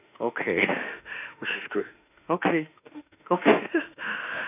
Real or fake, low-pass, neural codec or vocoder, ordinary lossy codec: fake; 3.6 kHz; autoencoder, 48 kHz, 32 numbers a frame, DAC-VAE, trained on Japanese speech; none